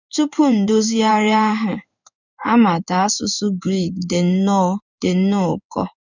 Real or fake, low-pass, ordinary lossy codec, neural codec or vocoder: fake; 7.2 kHz; none; codec, 16 kHz in and 24 kHz out, 1 kbps, XY-Tokenizer